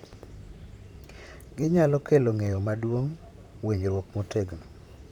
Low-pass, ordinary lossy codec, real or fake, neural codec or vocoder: 19.8 kHz; none; fake; vocoder, 44.1 kHz, 128 mel bands, Pupu-Vocoder